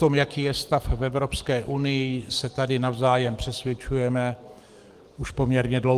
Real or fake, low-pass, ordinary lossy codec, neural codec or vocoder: fake; 14.4 kHz; Opus, 24 kbps; codec, 44.1 kHz, 7.8 kbps, DAC